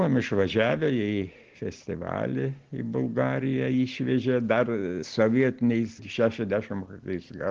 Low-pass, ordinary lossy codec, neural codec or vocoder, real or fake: 7.2 kHz; Opus, 16 kbps; none; real